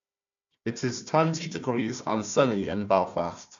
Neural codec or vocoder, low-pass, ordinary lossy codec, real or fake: codec, 16 kHz, 1 kbps, FunCodec, trained on Chinese and English, 50 frames a second; 7.2 kHz; MP3, 64 kbps; fake